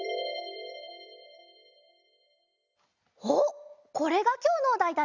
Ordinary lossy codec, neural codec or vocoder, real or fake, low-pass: none; none; real; 7.2 kHz